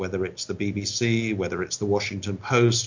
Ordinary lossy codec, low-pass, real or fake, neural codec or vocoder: MP3, 48 kbps; 7.2 kHz; real; none